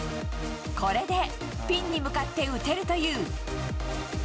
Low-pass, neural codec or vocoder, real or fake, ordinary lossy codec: none; none; real; none